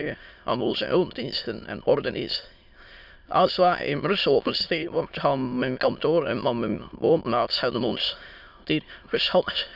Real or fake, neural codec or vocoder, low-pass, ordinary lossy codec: fake; autoencoder, 22.05 kHz, a latent of 192 numbers a frame, VITS, trained on many speakers; 5.4 kHz; none